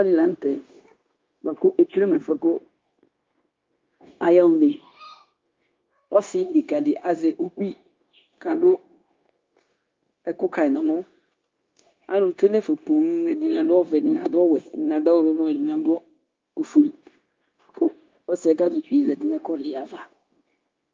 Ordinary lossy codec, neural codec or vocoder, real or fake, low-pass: Opus, 32 kbps; codec, 16 kHz, 0.9 kbps, LongCat-Audio-Codec; fake; 7.2 kHz